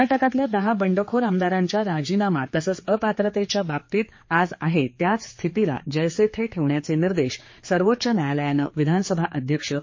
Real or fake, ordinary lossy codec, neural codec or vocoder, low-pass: fake; MP3, 32 kbps; codec, 16 kHz, 4 kbps, X-Codec, HuBERT features, trained on general audio; 7.2 kHz